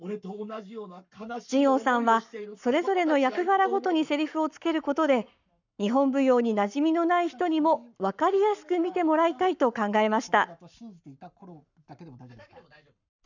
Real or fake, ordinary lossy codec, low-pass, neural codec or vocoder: fake; none; 7.2 kHz; codec, 44.1 kHz, 7.8 kbps, Pupu-Codec